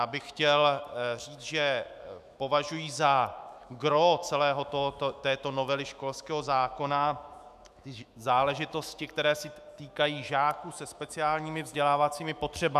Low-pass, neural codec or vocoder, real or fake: 14.4 kHz; autoencoder, 48 kHz, 128 numbers a frame, DAC-VAE, trained on Japanese speech; fake